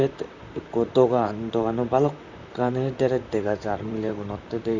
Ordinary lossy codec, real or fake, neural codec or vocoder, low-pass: none; fake; vocoder, 44.1 kHz, 128 mel bands, Pupu-Vocoder; 7.2 kHz